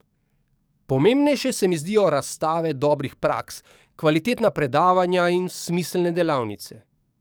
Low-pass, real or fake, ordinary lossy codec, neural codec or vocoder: none; fake; none; codec, 44.1 kHz, 7.8 kbps, DAC